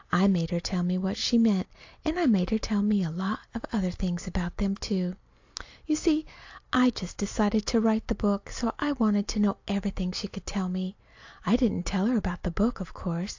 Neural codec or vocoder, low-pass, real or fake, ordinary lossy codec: none; 7.2 kHz; real; AAC, 48 kbps